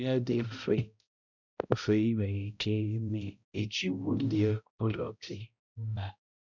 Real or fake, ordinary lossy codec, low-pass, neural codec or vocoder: fake; none; 7.2 kHz; codec, 16 kHz, 0.5 kbps, X-Codec, HuBERT features, trained on balanced general audio